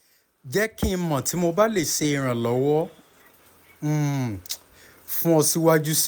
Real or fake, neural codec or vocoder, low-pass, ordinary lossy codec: real; none; none; none